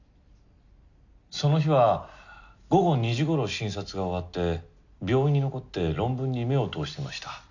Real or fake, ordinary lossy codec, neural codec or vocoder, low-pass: real; none; none; 7.2 kHz